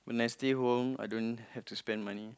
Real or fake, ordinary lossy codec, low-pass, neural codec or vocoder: real; none; none; none